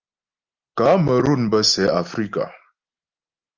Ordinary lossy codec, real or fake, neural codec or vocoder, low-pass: Opus, 24 kbps; real; none; 7.2 kHz